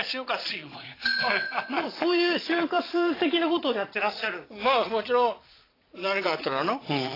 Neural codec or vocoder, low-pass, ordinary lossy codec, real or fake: vocoder, 22.05 kHz, 80 mel bands, Vocos; 5.4 kHz; AAC, 24 kbps; fake